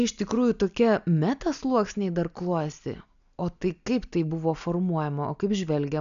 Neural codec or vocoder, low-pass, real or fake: none; 7.2 kHz; real